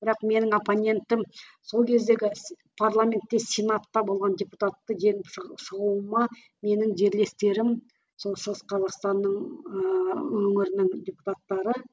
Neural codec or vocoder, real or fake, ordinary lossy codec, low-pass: none; real; none; none